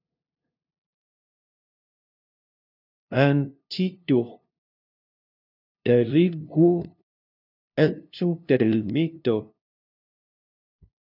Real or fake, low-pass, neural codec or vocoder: fake; 5.4 kHz; codec, 16 kHz, 0.5 kbps, FunCodec, trained on LibriTTS, 25 frames a second